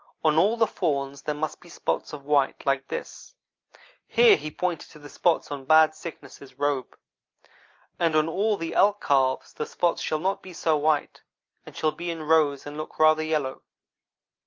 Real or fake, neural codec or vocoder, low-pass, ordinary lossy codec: real; none; 7.2 kHz; Opus, 32 kbps